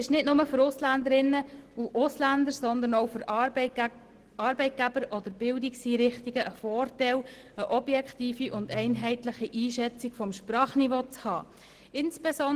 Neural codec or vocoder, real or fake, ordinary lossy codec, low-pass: none; real; Opus, 16 kbps; 14.4 kHz